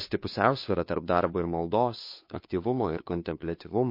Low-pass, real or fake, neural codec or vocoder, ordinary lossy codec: 5.4 kHz; fake; codec, 16 kHz, 2 kbps, FunCodec, trained on Chinese and English, 25 frames a second; MP3, 32 kbps